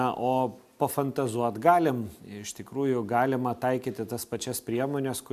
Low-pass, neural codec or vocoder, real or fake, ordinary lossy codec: 14.4 kHz; none; real; MP3, 96 kbps